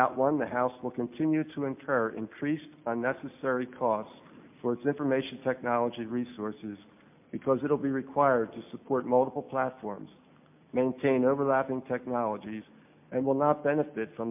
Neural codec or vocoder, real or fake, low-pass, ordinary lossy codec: none; real; 3.6 kHz; MP3, 32 kbps